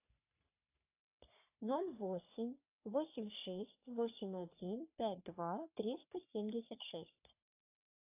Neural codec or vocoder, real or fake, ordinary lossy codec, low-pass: codec, 44.1 kHz, 3.4 kbps, Pupu-Codec; fake; AAC, 32 kbps; 3.6 kHz